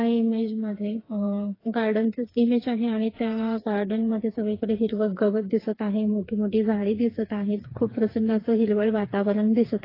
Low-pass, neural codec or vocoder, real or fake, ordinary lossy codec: 5.4 kHz; codec, 16 kHz, 4 kbps, FreqCodec, smaller model; fake; AAC, 24 kbps